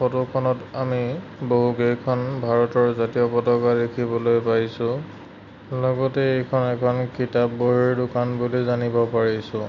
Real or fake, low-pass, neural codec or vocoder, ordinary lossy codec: real; 7.2 kHz; none; none